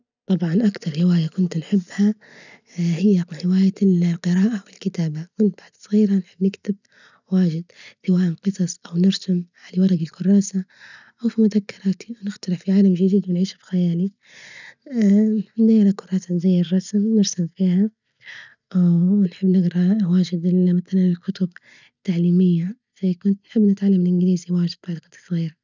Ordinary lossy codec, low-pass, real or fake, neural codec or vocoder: none; 7.2 kHz; real; none